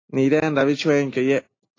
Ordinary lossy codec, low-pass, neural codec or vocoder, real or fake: AAC, 32 kbps; 7.2 kHz; none; real